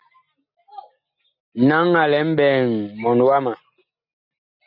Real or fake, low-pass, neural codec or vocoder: real; 5.4 kHz; none